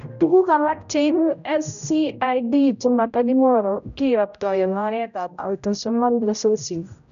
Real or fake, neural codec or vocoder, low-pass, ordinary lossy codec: fake; codec, 16 kHz, 0.5 kbps, X-Codec, HuBERT features, trained on general audio; 7.2 kHz; none